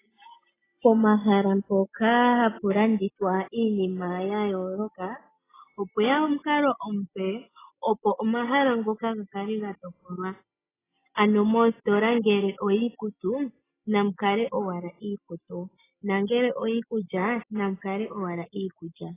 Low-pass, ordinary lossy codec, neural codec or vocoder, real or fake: 3.6 kHz; AAC, 16 kbps; none; real